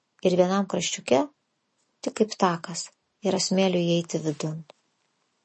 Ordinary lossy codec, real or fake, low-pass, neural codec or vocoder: MP3, 32 kbps; real; 9.9 kHz; none